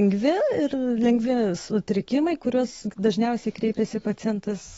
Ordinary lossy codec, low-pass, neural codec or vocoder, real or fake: AAC, 24 kbps; 19.8 kHz; autoencoder, 48 kHz, 128 numbers a frame, DAC-VAE, trained on Japanese speech; fake